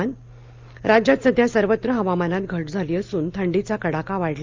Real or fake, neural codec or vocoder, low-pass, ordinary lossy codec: real; none; 7.2 kHz; Opus, 32 kbps